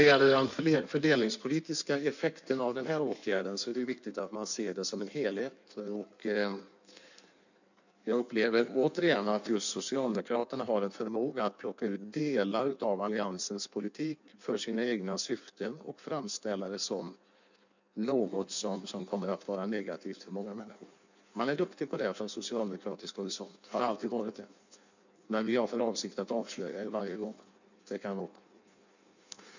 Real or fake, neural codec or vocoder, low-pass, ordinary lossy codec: fake; codec, 16 kHz in and 24 kHz out, 1.1 kbps, FireRedTTS-2 codec; 7.2 kHz; none